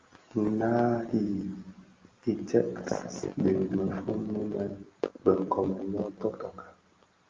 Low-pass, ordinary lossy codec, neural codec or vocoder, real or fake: 7.2 kHz; Opus, 32 kbps; none; real